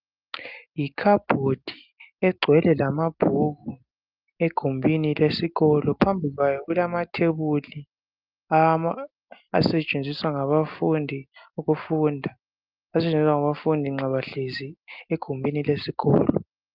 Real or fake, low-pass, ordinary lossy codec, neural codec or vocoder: real; 5.4 kHz; Opus, 24 kbps; none